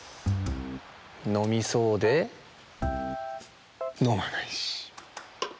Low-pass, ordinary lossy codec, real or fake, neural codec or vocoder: none; none; real; none